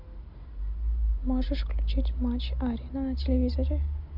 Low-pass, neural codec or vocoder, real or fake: 5.4 kHz; none; real